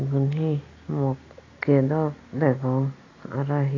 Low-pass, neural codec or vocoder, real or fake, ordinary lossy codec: 7.2 kHz; none; real; AAC, 32 kbps